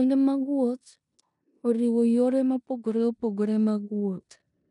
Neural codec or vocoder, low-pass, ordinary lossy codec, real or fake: codec, 16 kHz in and 24 kHz out, 0.9 kbps, LongCat-Audio-Codec, four codebook decoder; 10.8 kHz; none; fake